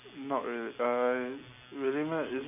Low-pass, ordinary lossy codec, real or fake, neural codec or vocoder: 3.6 kHz; none; real; none